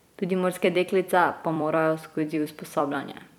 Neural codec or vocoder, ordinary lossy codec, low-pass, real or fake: vocoder, 44.1 kHz, 128 mel bands every 256 samples, BigVGAN v2; none; 19.8 kHz; fake